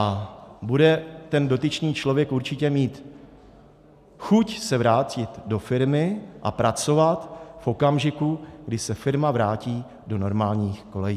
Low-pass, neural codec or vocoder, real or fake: 14.4 kHz; none; real